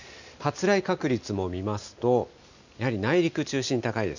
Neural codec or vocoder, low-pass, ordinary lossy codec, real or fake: none; 7.2 kHz; none; real